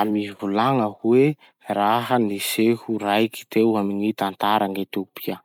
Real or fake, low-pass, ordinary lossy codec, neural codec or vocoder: real; 19.8 kHz; none; none